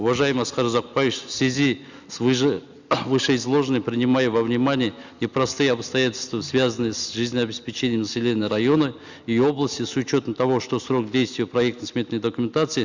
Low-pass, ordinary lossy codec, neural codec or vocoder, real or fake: 7.2 kHz; Opus, 64 kbps; none; real